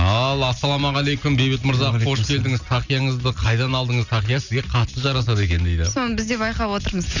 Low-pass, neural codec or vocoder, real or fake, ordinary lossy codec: 7.2 kHz; none; real; none